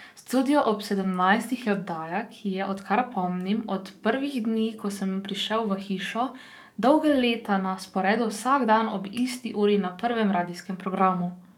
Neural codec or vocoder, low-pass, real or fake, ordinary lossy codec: codec, 44.1 kHz, 7.8 kbps, DAC; 19.8 kHz; fake; none